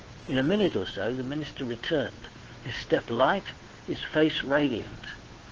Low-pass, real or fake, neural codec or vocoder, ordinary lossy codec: 7.2 kHz; fake; codec, 16 kHz, 2 kbps, FunCodec, trained on Chinese and English, 25 frames a second; Opus, 16 kbps